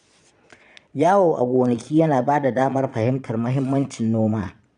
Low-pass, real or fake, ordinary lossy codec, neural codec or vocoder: 9.9 kHz; fake; none; vocoder, 22.05 kHz, 80 mel bands, Vocos